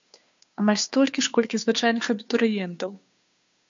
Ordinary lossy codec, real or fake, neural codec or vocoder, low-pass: MP3, 64 kbps; fake; codec, 16 kHz, 2 kbps, FunCodec, trained on Chinese and English, 25 frames a second; 7.2 kHz